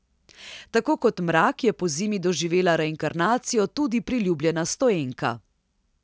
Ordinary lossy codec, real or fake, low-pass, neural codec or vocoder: none; real; none; none